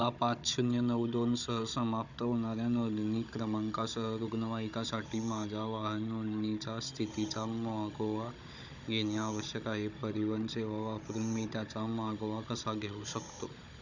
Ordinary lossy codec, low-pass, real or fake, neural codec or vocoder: none; 7.2 kHz; fake; codec, 16 kHz, 16 kbps, FunCodec, trained on Chinese and English, 50 frames a second